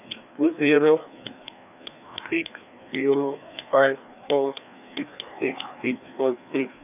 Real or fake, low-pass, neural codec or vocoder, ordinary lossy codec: fake; 3.6 kHz; codec, 16 kHz, 2 kbps, FreqCodec, larger model; none